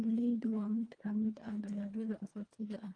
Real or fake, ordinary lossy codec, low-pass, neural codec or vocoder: fake; Opus, 32 kbps; 9.9 kHz; codec, 24 kHz, 1.5 kbps, HILCodec